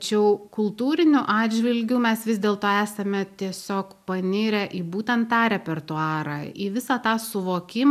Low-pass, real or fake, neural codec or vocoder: 14.4 kHz; real; none